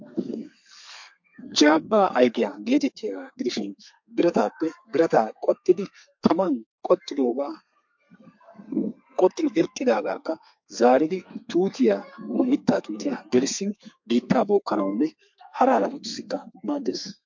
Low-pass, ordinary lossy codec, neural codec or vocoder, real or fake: 7.2 kHz; MP3, 48 kbps; codec, 32 kHz, 1.9 kbps, SNAC; fake